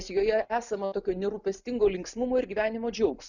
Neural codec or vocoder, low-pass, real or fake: none; 7.2 kHz; real